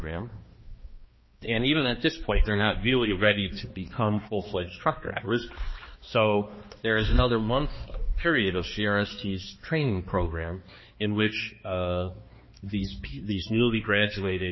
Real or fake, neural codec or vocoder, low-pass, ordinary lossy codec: fake; codec, 16 kHz, 2 kbps, X-Codec, HuBERT features, trained on balanced general audio; 7.2 kHz; MP3, 24 kbps